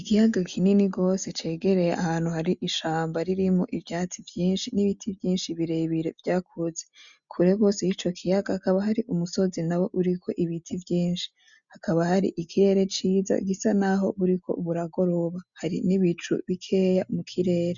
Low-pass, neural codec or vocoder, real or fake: 7.2 kHz; none; real